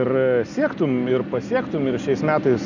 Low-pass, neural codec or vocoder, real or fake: 7.2 kHz; none; real